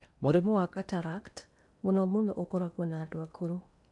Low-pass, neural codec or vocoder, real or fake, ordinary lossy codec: 10.8 kHz; codec, 16 kHz in and 24 kHz out, 0.8 kbps, FocalCodec, streaming, 65536 codes; fake; none